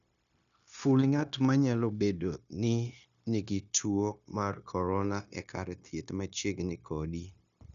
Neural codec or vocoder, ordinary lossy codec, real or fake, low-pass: codec, 16 kHz, 0.9 kbps, LongCat-Audio-Codec; none; fake; 7.2 kHz